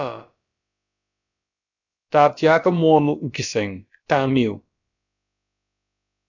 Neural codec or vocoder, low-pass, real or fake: codec, 16 kHz, about 1 kbps, DyCAST, with the encoder's durations; 7.2 kHz; fake